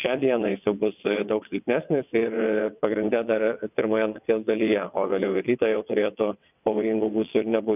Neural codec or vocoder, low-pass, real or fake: vocoder, 22.05 kHz, 80 mel bands, WaveNeXt; 3.6 kHz; fake